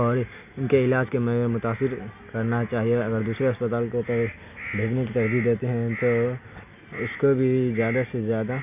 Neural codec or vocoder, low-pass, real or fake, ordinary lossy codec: none; 3.6 kHz; real; none